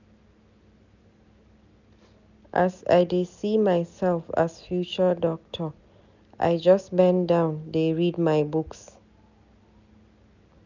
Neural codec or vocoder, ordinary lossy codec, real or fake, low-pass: none; none; real; 7.2 kHz